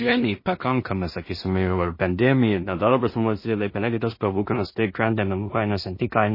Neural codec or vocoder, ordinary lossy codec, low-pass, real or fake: codec, 16 kHz in and 24 kHz out, 0.4 kbps, LongCat-Audio-Codec, two codebook decoder; MP3, 24 kbps; 5.4 kHz; fake